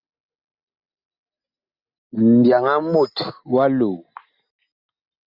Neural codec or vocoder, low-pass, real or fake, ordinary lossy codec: none; 5.4 kHz; real; Opus, 64 kbps